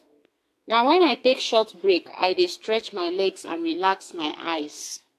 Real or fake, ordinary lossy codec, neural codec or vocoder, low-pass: fake; AAC, 64 kbps; codec, 32 kHz, 1.9 kbps, SNAC; 14.4 kHz